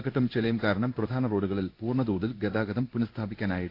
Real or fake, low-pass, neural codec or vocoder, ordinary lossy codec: fake; 5.4 kHz; codec, 16 kHz in and 24 kHz out, 1 kbps, XY-Tokenizer; AAC, 32 kbps